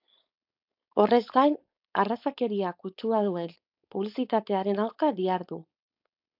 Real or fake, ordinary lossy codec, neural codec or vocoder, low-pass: fake; AAC, 48 kbps; codec, 16 kHz, 4.8 kbps, FACodec; 5.4 kHz